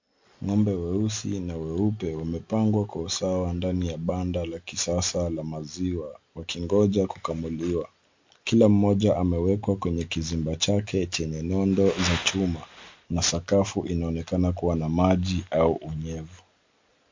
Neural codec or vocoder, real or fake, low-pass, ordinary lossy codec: none; real; 7.2 kHz; MP3, 48 kbps